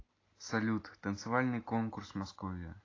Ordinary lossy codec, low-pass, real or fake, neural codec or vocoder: AAC, 32 kbps; 7.2 kHz; real; none